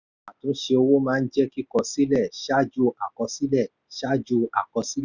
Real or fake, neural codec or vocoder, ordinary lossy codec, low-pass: real; none; none; 7.2 kHz